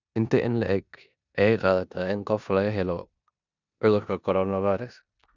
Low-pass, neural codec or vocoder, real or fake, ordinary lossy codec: 7.2 kHz; codec, 16 kHz in and 24 kHz out, 0.9 kbps, LongCat-Audio-Codec, four codebook decoder; fake; none